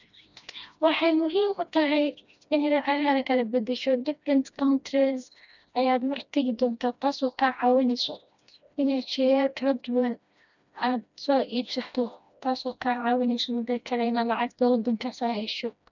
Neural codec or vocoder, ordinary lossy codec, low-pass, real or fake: codec, 16 kHz, 1 kbps, FreqCodec, smaller model; none; 7.2 kHz; fake